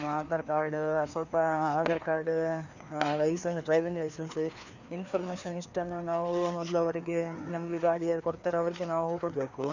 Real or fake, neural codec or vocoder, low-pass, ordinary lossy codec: fake; codec, 16 kHz, 2 kbps, FreqCodec, larger model; 7.2 kHz; none